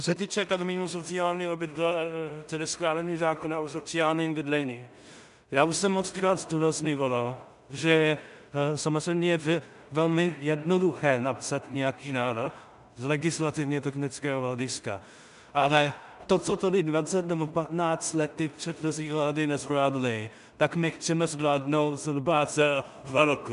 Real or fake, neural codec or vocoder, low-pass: fake; codec, 16 kHz in and 24 kHz out, 0.4 kbps, LongCat-Audio-Codec, two codebook decoder; 10.8 kHz